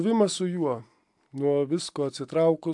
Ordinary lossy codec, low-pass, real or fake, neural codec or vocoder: AAC, 64 kbps; 10.8 kHz; real; none